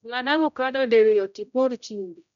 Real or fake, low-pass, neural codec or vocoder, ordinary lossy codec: fake; 7.2 kHz; codec, 16 kHz, 0.5 kbps, X-Codec, HuBERT features, trained on general audio; none